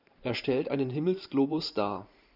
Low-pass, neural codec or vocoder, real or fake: 5.4 kHz; vocoder, 44.1 kHz, 128 mel bands every 512 samples, BigVGAN v2; fake